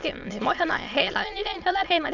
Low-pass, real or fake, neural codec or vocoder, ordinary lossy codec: 7.2 kHz; fake; autoencoder, 22.05 kHz, a latent of 192 numbers a frame, VITS, trained on many speakers; none